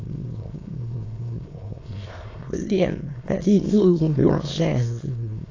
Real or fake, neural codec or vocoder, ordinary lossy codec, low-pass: fake; autoencoder, 22.05 kHz, a latent of 192 numbers a frame, VITS, trained on many speakers; AAC, 32 kbps; 7.2 kHz